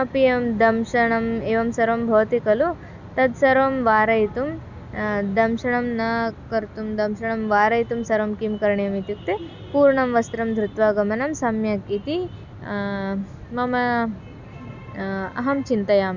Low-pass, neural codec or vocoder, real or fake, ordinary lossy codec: 7.2 kHz; none; real; none